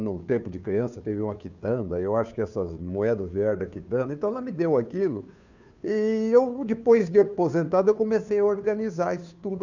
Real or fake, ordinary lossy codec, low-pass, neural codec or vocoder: fake; none; 7.2 kHz; codec, 16 kHz, 2 kbps, FunCodec, trained on Chinese and English, 25 frames a second